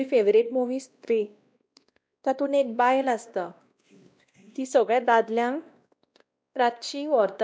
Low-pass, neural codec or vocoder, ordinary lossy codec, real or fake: none; codec, 16 kHz, 1 kbps, X-Codec, WavLM features, trained on Multilingual LibriSpeech; none; fake